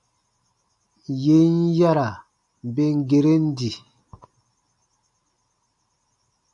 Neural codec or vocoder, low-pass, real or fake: none; 10.8 kHz; real